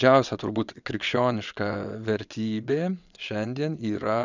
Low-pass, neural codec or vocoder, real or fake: 7.2 kHz; vocoder, 22.05 kHz, 80 mel bands, WaveNeXt; fake